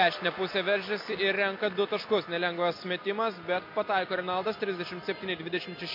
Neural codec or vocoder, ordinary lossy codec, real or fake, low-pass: none; MP3, 32 kbps; real; 5.4 kHz